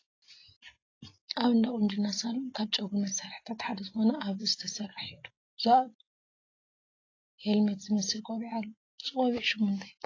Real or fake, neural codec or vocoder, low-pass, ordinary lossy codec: real; none; 7.2 kHz; AAC, 32 kbps